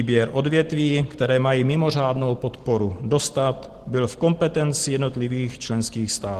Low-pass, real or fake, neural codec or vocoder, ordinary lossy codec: 14.4 kHz; real; none; Opus, 16 kbps